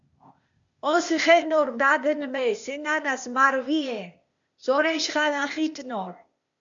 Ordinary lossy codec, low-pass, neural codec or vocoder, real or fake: MP3, 64 kbps; 7.2 kHz; codec, 16 kHz, 0.8 kbps, ZipCodec; fake